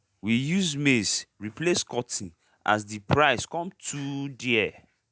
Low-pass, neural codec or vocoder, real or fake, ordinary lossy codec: none; none; real; none